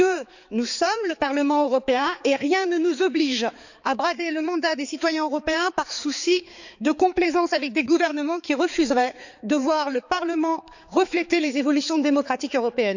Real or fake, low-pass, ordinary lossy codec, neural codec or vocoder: fake; 7.2 kHz; none; codec, 16 kHz, 4 kbps, X-Codec, HuBERT features, trained on balanced general audio